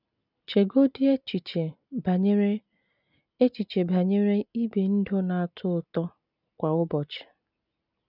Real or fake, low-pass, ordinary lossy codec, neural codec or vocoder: real; 5.4 kHz; none; none